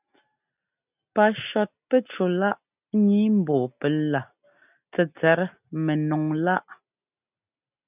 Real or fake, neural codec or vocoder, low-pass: real; none; 3.6 kHz